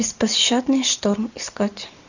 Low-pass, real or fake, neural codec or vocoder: 7.2 kHz; real; none